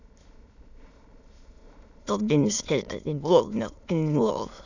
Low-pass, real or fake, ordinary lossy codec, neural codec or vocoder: 7.2 kHz; fake; none; autoencoder, 22.05 kHz, a latent of 192 numbers a frame, VITS, trained on many speakers